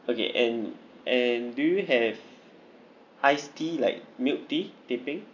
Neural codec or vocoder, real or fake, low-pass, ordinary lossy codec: none; real; 7.2 kHz; none